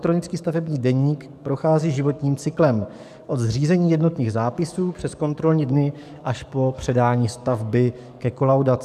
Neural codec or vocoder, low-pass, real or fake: codec, 44.1 kHz, 7.8 kbps, DAC; 14.4 kHz; fake